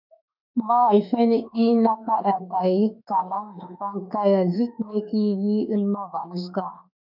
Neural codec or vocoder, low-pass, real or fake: autoencoder, 48 kHz, 32 numbers a frame, DAC-VAE, trained on Japanese speech; 5.4 kHz; fake